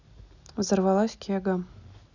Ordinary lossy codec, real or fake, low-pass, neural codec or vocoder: none; real; 7.2 kHz; none